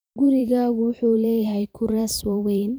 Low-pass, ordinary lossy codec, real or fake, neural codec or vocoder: none; none; fake; vocoder, 44.1 kHz, 128 mel bands every 512 samples, BigVGAN v2